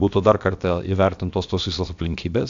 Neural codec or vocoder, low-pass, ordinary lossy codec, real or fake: codec, 16 kHz, about 1 kbps, DyCAST, with the encoder's durations; 7.2 kHz; AAC, 64 kbps; fake